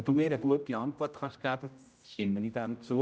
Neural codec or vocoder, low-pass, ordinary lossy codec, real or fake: codec, 16 kHz, 0.5 kbps, X-Codec, HuBERT features, trained on general audio; none; none; fake